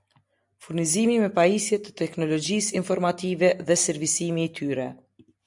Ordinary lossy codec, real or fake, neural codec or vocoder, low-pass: MP3, 96 kbps; real; none; 10.8 kHz